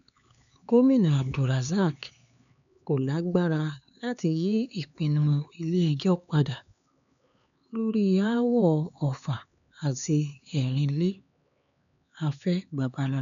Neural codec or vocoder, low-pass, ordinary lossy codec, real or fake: codec, 16 kHz, 4 kbps, X-Codec, HuBERT features, trained on LibriSpeech; 7.2 kHz; none; fake